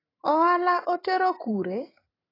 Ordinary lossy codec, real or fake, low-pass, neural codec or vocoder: AAC, 24 kbps; fake; 5.4 kHz; vocoder, 44.1 kHz, 128 mel bands every 512 samples, BigVGAN v2